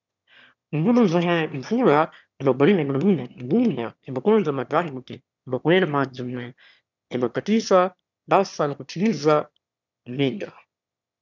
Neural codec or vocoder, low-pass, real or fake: autoencoder, 22.05 kHz, a latent of 192 numbers a frame, VITS, trained on one speaker; 7.2 kHz; fake